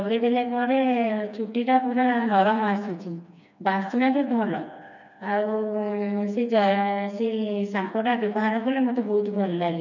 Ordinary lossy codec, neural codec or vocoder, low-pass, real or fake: none; codec, 16 kHz, 2 kbps, FreqCodec, smaller model; 7.2 kHz; fake